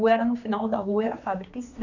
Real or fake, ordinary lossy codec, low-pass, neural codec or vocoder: fake; none; 7.2 kHz; codec, 16 kHz, 2 kbps, X-Codec, HuBERT features, trained on general audio